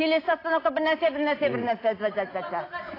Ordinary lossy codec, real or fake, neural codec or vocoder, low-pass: AAC, 24 kbps; real; none; 5.4 kHz